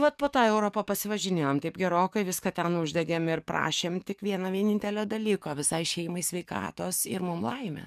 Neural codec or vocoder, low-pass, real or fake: codec, 44.1 kHz, 7.8 kbps, DAC; 14.4 kHz; fake